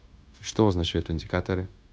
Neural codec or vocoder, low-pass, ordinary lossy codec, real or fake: codec, 16 kHz, 0.9 kbps, LongCat-Audio-Codec; none; none; fake